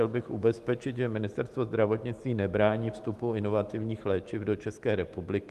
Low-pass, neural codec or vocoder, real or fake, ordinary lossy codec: 14.4 kHz; autoencoder, 48 kHz, 128 numbers a frame, DAC-VAE, trained on Japanese speech; fake; Opus, 24 kbps